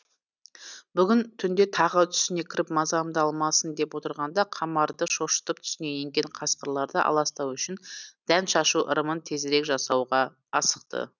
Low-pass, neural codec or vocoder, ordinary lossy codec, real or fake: 7.2 kHz; none; none; real